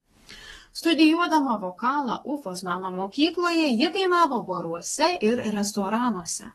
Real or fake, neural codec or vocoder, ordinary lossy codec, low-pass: fake; codec, 32 kHz, 1.9 kbps, SNAC; AAC, 32 kbps; 14.4 kHz